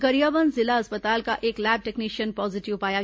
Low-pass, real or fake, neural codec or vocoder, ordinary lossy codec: none; real; none; none